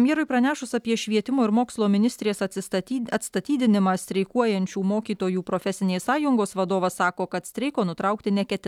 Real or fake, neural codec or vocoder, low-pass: real; none; 19.8 kHz